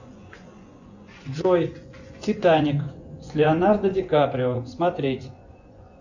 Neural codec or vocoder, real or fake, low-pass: vocoder, 24 kHz, 100 mel bands, Vocos; fake; 7.2 kHz